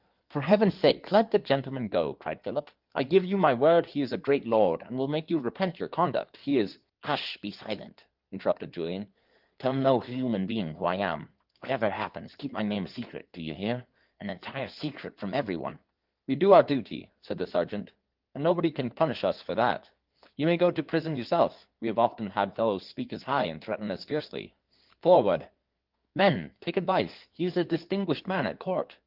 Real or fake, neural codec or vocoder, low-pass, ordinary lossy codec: fake; codec, 16 kHz in and 24 kHz out, 2.2 kbps, FireRedTTS-2 codec; 5.4 kHz; Opus, 32 kbps